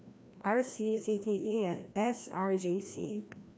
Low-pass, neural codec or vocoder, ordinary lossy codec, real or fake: none; codec, 16 kHz, 1 kbps, FreqCodec, larger model; none; fake